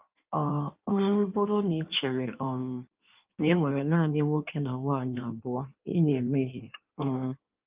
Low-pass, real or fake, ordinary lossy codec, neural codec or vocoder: 3.6 kHz; fake; Opus, 24 kbps; codec, 16 kHz in and 24 kHz out, 1.1 kbps, FireRedTTS-2 codec